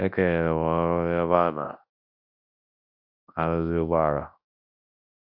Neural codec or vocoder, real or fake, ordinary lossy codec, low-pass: codec, 24 kHz, 0.9 kbps, WavTokenizer, large speech release; fake; AAC, 48 kbps; 5.4 kHz